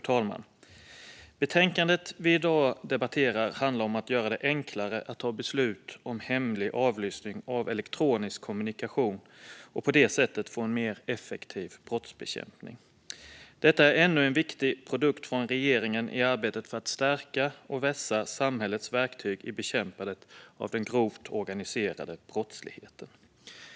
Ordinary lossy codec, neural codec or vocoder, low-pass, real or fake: none; none; none; real